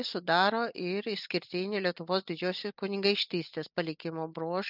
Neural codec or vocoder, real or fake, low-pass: none; real; 5.4 kHz